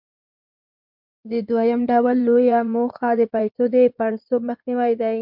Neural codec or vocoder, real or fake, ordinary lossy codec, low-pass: codec, 16 kHz in and 24 kHz out, 2.2 kbps, FireRedTTS-2 codec; fake; MP3, 48 kbps; 5.4 kHz